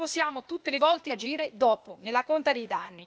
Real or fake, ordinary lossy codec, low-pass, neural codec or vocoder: fake; none; none; codec, 16 kHz, 0.8 kbps, ZipCodec